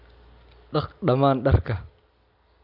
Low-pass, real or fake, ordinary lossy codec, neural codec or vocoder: 5.4 kHz; real; none; none